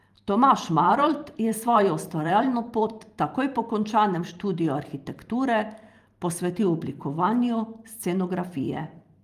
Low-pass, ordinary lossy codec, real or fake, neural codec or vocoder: 14.4 kHz; Opus, 32 kbps; fake; vocoder, 44.1 kHz, 128 mel bands every 512 samples, BigVGAN v2